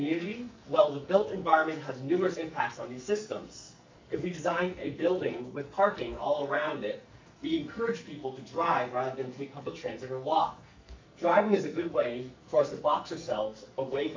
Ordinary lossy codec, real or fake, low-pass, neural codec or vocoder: MP3, 48 kbps; fake; 7.2 kHz; codec, 44.1 kHz, 2.6 kbps, SNAC